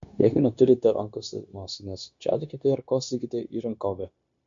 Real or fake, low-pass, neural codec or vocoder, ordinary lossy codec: fake; 7.2 kHz; codec, 16 kHz, 0.9 kbps, LongCat-Audio-Codec; MP3, 48 kbps